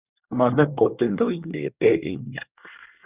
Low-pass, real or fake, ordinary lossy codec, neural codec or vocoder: 3.6 kHz; fake; Opus, 64 kbps; codec, 24 kHz, 1 kbps, SNAC